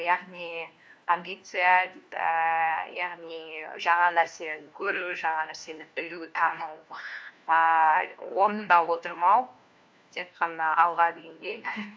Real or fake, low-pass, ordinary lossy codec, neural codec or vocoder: fake; none; none; codec, 16 kHz, 1 kbps, FunCodec, trained on LibriTTS, 50 frames a second